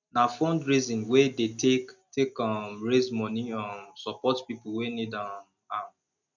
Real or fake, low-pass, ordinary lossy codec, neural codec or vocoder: real; 7.2 kHz; none; none